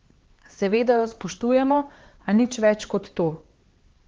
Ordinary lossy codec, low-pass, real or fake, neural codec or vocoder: Opus, 16 kbps; 7.2 kHz; fake; codec, 16 kHz, 4 kbps, X-Codec, HuBERT features, trained on LibriSpeech